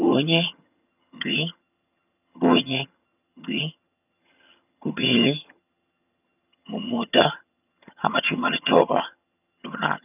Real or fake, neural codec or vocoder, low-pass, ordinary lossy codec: fake; vocoder, 22.05 kHz, 80 mel bands, HiFi-GAN; 3.6 kHz; none